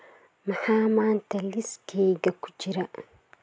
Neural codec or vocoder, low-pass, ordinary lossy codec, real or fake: none; none; none; real